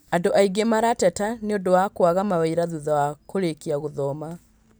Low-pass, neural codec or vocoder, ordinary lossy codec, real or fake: none; none; none; real